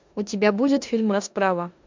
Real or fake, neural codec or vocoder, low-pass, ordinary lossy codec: fake; codec, 16 kHz in and 24 kHz out, 0.9 kbps, LongCat-Audio-Codec, four codebook decoder; 7.2 kHz; MP3, 64 kbps